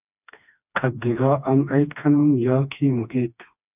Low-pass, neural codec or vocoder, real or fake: 3.6 kHz; codec, 16 kHz, 2 kbps, FreqCodec, smaller model; fake